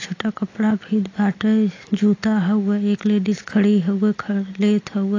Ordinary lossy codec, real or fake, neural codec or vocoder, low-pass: AAC, 32 kbps; real; none; 7.2 kHz